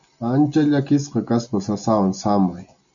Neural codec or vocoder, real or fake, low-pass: none; real; 7.2 kHz